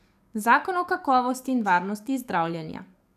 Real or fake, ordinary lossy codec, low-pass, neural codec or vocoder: fake; none; 14.4 kHz; codec, 44.1 kHz, 7.8 kbps, DAC